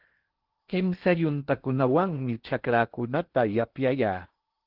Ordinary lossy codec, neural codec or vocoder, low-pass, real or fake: Opus, 16 kbps; codec, 16 kHz in and 24 kHz out, 0.8 kbps, FocalCodec, streaming, 65536 codes; 5.4 kHz; fake